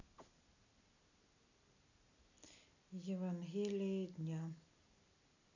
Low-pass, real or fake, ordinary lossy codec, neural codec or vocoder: 7.2 kHz; real; none; none